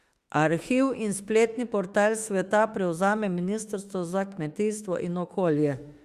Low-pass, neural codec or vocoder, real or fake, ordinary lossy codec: 14.4 kHz; autoencoder, 48 kHz, 32 numbers a frame, DAC-VAE, trained on Japanese speech; fake; Opus, 64 kbps